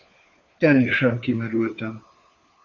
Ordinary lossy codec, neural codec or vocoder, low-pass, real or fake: Opus, 64 kbps; codec, 16 kHz, 4 kbps, FunCodec, trained on LibriTTS, 50 frames a second; 7.2 kHz; fake